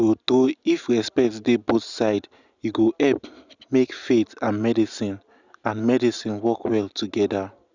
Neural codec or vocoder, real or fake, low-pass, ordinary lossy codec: none; real; 7.2 kHz; Opus, 64 kbps